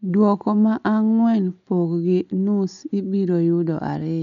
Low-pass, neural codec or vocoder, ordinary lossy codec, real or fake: 7.2 kHz; none; none; real